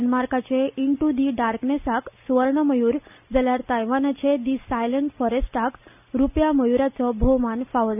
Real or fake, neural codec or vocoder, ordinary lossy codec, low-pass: real; none; none; 3.6 kHz